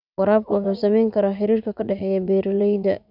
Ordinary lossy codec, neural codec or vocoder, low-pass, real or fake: none; vocoder, 44.1 kHz, 80 mel bands, Vocos; 5.4 kHz; fake